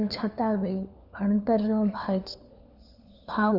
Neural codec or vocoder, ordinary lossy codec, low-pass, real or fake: codec, 16 kHz, 4 kbps, FunCodec, trained on LibriTTS, 50 frames a second; none; 5.4 kHz; fake